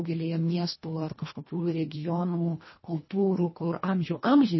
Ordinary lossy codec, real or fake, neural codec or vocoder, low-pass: MP3, 24 kbps; fake; codec, 24 kHz, 1.5 kbps, HILCodec; 7.2 kHz